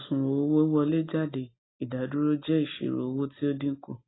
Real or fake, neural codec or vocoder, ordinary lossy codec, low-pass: real; none; AAC, 16 kbps; 7.2 kHz